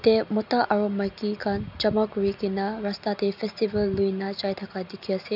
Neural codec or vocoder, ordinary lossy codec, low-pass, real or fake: none; none; 5.4 kHz; real